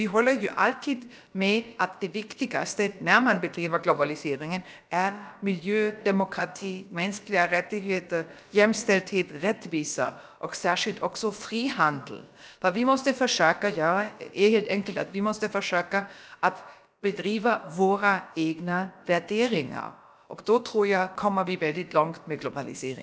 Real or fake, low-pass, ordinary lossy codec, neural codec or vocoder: fake; none; none; codec, 16 kHz, about 1 kbps, DyCAST, with the encoder's durations